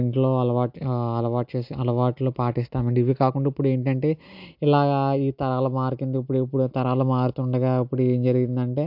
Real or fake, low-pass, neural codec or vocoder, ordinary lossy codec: real; 5.4 kHz; none; MP3, 48 kbps